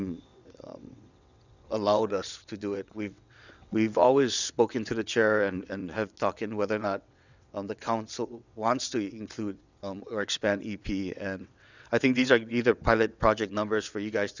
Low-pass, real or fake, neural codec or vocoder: 7.2 kHz; fake; vocoder, 22.05 kHz, 80 mel bands, WaveNeXt